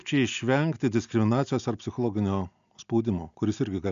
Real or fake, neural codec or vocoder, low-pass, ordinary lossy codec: real; none; 7.2 kHz; MP3, 64 kbps